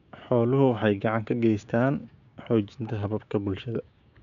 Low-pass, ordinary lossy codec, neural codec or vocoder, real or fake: 7.2 kHz; none; codec, 16 kHz, 6 kbps, DAC; fake